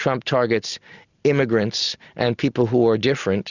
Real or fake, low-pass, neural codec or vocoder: real; 7.2 kHz; none